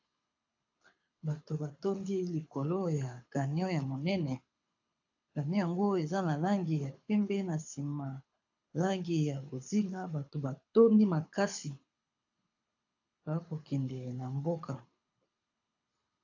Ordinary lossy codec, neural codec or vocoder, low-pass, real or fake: AAC, 48 kbps; codec, 24 kHz, 6 kbps, HILCodec; 7.2 kHz; fake